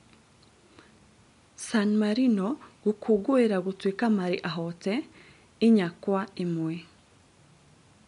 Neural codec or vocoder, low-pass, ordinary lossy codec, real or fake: none; 10.8 kHz; MP3, 64 kbps; real